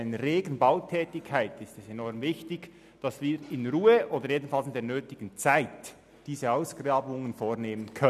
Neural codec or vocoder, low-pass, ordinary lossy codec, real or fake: none; 14.4 kHz; none; real